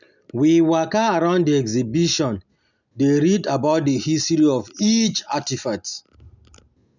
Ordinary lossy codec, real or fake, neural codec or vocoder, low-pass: none; real; none; 7.2 kHz